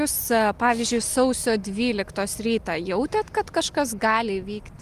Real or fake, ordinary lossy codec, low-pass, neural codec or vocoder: real; Opus, 32 kbps; 14.4 kHz; none